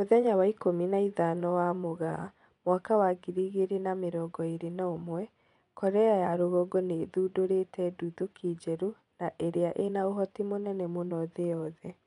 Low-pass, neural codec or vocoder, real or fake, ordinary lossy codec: 10.8 kHz; vocoder, 24 kHz, 100 mel bands, Vocos; fake; none